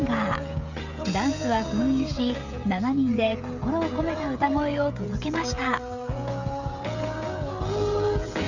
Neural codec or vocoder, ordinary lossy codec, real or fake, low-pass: codec, 16 kHz, 16 kbps, FreqCodec, smaller model; none; fake; 7.2 kHz